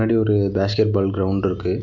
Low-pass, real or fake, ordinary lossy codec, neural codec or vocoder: 7.2 kHz; real; none; none